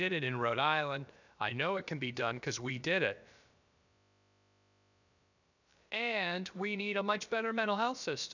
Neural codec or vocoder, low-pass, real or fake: codec, 16 kHz, about 1 kbps, DyCAST, with the encoder's durations; 7.2 kHz; fake